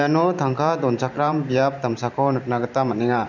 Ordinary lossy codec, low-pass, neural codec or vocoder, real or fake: none; 7.2 kHz; vocoder, 44.1 kHz, 128 mel bands every 512 samples, BigVGAN v2; fake